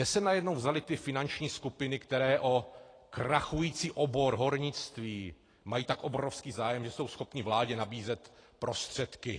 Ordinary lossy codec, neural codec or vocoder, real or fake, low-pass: AAC, 32 kbps; vocoder, 44.1 kHz, 128 mel bands every 512 samples, BigVGAN v2; fake; 9.9 kHz